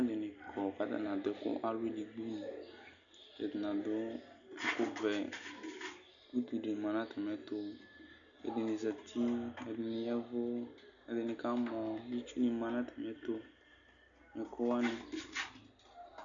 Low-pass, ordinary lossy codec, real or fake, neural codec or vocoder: 7.2 kHz; MP3, 96 kbps; real; none